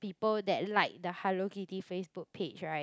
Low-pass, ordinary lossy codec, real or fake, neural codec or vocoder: none; none; real; none